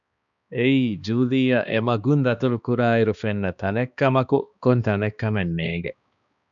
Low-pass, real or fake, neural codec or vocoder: 7.2 kHz; fake; codec, 16 kHz, 2 kbps, X-Codec, HuBERT features, trained on balanced general audio